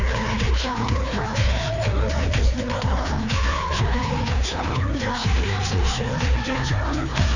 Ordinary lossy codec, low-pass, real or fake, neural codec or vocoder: none; 7.2 kHz; fake; codec, 16 kHz, 2 kbps, FreqCodec, larger model